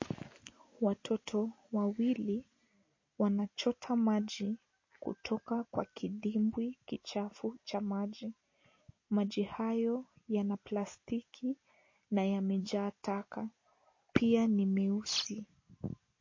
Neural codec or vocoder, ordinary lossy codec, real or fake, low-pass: none; MP3, 32 kbps; real; 7.2 kHz